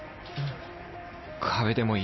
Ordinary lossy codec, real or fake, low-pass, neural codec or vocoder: MP3, 24 kbps; real; 7.2 kHz; none